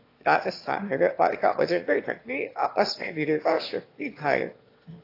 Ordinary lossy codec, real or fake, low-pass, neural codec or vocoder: AAC, 32 kbps; fake; 5.4 kHz; autoencoder, 22.05 kHz, a latent of 192 numbers a frame, VITS, trained on one speaker